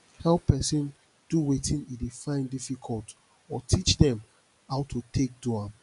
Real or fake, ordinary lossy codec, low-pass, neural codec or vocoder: real; none; 10.8 kHz; none